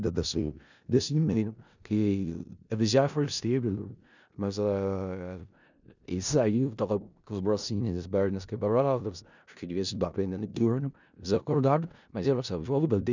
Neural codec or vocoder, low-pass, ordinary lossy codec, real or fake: codec, 16 kHz in and 24 kHz out, 0.4 kbps, LongCat-Audio-Codec, four codebook decoder; 7.2 kHz; none; fake